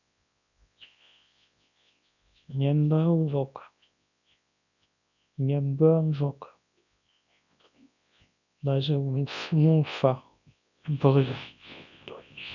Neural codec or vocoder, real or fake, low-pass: codec, 24 kHz, 0.9 kbps, WavTokenizer, large speech release; fake; 7.2 kHz